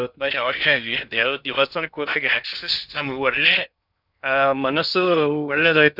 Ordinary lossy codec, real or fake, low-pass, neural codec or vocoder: none; fake; 5.4 kHz; codec, 16 kHz in and 24 kHz out, 0.6 kbps, FocalCodec, streaming, 2048 codes